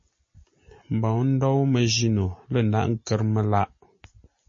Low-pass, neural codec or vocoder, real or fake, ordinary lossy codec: 7.2 kHz; none; real; MP3, 32 kbps